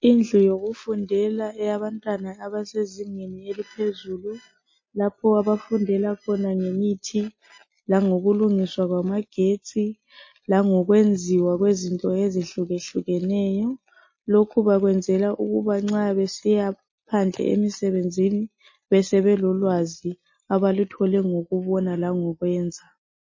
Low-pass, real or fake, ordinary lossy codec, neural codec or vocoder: 7.2 kHz; real; MP3, 32 kbps; none